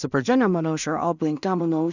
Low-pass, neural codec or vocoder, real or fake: 7.2 kHz; codec, 16 kHz in and 24 kHz out, 0.4 kbps, LongCat-Audio-Codec, two codebook decoder; fake